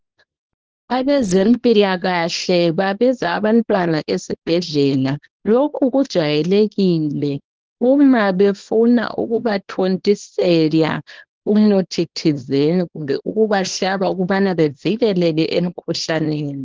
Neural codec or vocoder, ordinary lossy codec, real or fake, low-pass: codec, 24 kHz, 0.9 kbps, WavTokenizer, small release; Opus, 16 kbps; fake; 7.2 kHz